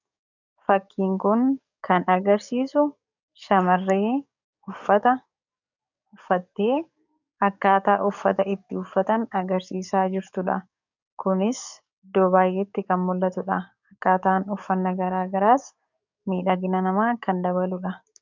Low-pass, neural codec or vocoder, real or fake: 7.2 kHz; codec, 44.1 kHz, 7.8 kbps, DAC; fake